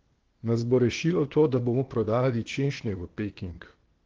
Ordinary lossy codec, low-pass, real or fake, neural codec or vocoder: Opus, 16 kbps; 7.2 kHz; fake; codec, 16 kHz, 0.8 kbps, ZipCodec